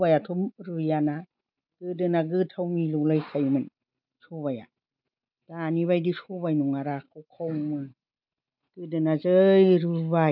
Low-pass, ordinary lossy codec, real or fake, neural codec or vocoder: 5.4 kHz; none; real; none